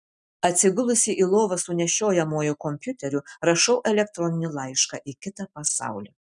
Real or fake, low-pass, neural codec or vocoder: real; 10.8 kHz; none